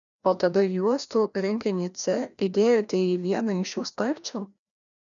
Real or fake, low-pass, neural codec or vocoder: fake; 7.2 kHz; codec, 16 kHz, 1 kbps, FreqCodec, larger model